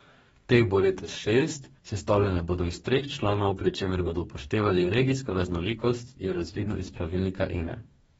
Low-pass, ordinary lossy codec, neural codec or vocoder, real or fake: 19.8 kHz; AAC, 24 kbps; codec, 44.1 kHz, 2.6 kbps, DAC; fake